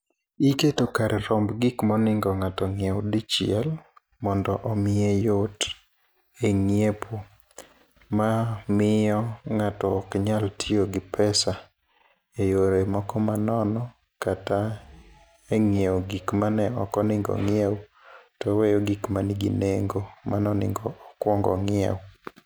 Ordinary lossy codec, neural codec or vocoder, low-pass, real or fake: none; none; none; real